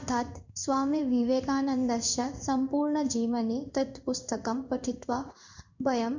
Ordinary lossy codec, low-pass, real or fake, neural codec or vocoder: none; 7.2 kHz; fake; codec, 16 kHz in and 24 kHz out, 1 kbps, XY-Tokenizer